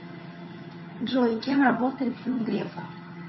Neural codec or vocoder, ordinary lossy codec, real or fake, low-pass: vocoder, 22.05 kHz, 80 mel bands, HiFi-GAN; MP3, 24 kbps; fake; 7.2 kHz